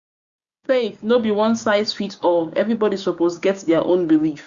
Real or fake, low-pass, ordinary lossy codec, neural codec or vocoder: fake; 7.2 kHz; Opus, 64 kbps; codec, 16 kHz, 6 kbps, DAC